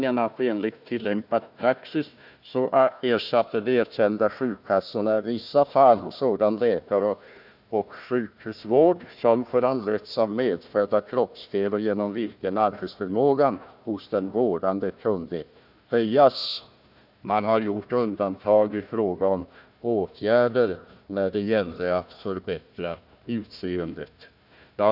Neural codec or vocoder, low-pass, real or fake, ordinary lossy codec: codec, 16 kHz, 1 kbps, FunCodec, trained on Chinese and English, 50 frames a second; 5.4 kHz; fake; none